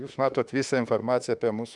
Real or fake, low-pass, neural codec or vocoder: fake; 10.8 kHz; autoencoder, 48 kHz, 32 numbers a frame, DAC-VAE, trained on Japanese speech